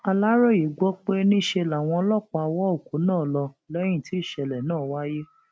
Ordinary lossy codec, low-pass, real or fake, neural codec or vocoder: none; none; real; none